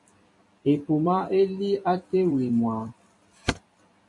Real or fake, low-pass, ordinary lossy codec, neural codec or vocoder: fake; 10.8 kHz; MP3, 48 kbps; vocoder, 44.1 kHz, 128 mel bands every 256 samples, BigVGAN v2